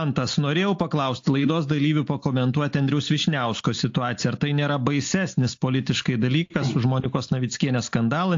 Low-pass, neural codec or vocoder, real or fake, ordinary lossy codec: 7.2 kHz; none; real; AAC, 48 kbps